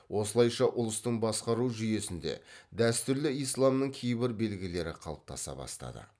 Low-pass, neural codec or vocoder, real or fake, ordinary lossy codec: none; none; real; none